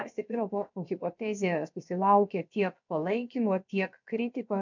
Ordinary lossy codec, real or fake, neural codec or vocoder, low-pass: MP3, 64 kbps; fake; codec, 16 kHz, about 1 kbps, DyCAST, with the encoder's durations; 7.2 kHz